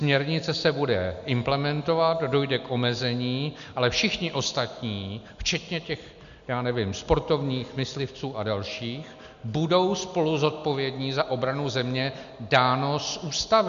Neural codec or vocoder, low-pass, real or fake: none; 7.2 kHz; real